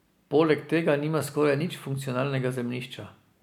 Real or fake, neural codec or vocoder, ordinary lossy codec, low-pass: real; none; none; 19.8 kHz